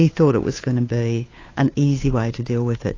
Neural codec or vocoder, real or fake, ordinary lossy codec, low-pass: codec, 16 kHz, 2 kbps, FunCodec, trained on Chinese and English, 25 frames a second; fake; AAC, 32 kbps; 7.2 kHz